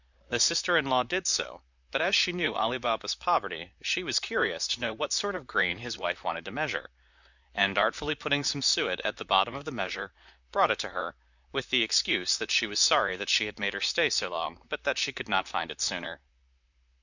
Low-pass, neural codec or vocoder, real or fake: 7.2 kHz; vocoder, 44.1 kHz, 128 mel bands, Pupu-Vocoder; fake